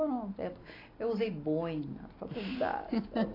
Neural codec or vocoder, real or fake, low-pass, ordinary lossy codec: none; real; 5.4 kHz; AAC, 24 kbps